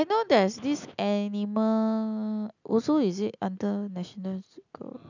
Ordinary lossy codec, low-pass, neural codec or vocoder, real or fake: none; 7.2 kHz; none; real